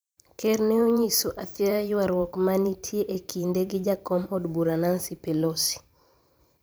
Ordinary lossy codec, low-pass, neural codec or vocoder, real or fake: none; none; vocoder, 44.1 kHz, 128 mel bands every 512 samples, BigVGAN v2; fake